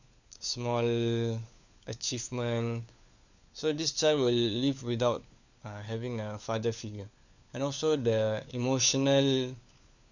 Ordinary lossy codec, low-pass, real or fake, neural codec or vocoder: none; 7.2 kHz; fake; codec, 16 kHz, 4 kbps, FunCodec, trained on LibriTTS, 50 frames a second